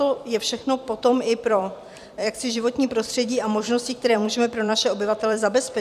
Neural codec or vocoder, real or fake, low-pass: vocoder, 44.1 kHz, 128 mel bands every 256 samples, BigVGAN v2; fake; 14.4 kHz